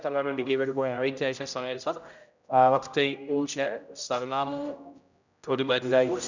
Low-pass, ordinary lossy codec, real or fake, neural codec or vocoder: 7.2 kHz; none; fake; codec, 16 kHz, 0.5 kbps, X-Codec, HuBERT features, trained on general audio